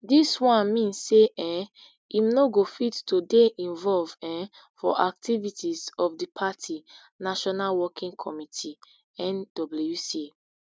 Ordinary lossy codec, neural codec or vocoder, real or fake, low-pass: none; none; real; none